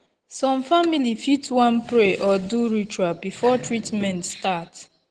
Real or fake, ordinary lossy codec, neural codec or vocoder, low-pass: real; Opus, 16 kbps; none; 9.9 kHz